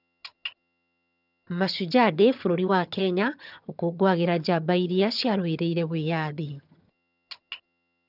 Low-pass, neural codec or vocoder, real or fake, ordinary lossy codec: 5.4 kHz; vocoder, 22.05 kHz, 80 mel bands, HiFi-GAN; fake; none